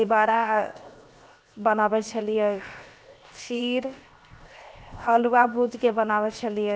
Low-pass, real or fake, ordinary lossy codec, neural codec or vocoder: none; fake; none; codec, 16 kHz, 0.7 kbps, FocalCodec